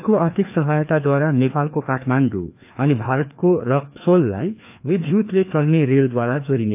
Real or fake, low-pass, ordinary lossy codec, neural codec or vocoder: fake; 3.6 kHz; AAC, 32 kbps; codec, 16 kHz, 2 kbps, FreqCodec, larger model